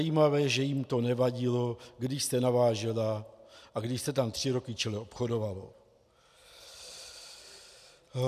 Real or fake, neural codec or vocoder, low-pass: real; none; 14.4 kHz